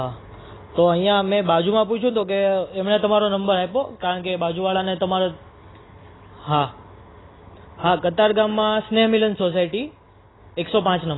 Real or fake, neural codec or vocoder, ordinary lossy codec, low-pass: real; none; AAC, 16 kbps; 7.2 kHz